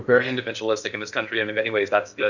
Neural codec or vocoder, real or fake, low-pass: codec, 16 kHz in and 24 kHz out, 0.8 kbps, FocalCodec, streaming, 65536 codes; fake; 7.2 kHz